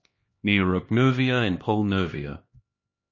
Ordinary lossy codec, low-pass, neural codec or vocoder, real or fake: MP3, 32 kbps; 7.2 kHz; codec, 16 kHz, 2 kbps, X-Codec, HuBERT features, trained on LibriSpeech; fake